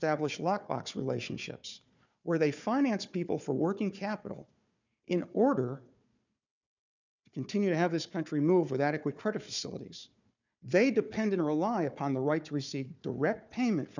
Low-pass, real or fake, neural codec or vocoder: 7.2 kHz; fake; codec, 16 kHz, 4 kbps, FunCodec, trained on Chinese and English, 50 frames a second